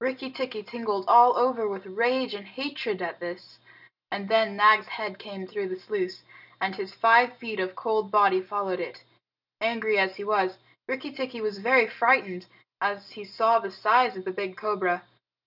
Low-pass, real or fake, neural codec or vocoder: 5.4 kHz; real; none